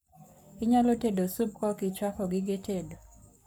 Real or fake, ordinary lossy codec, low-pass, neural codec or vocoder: fake; none; none; codec, 44.1 kHz, 7.8 kbps, Pupu-Codec